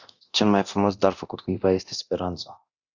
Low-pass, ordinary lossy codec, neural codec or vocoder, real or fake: 7.2 kHz; Opus, 64 kbps; codec, 24 kHz, 0.9 kbps, DualCodec; fake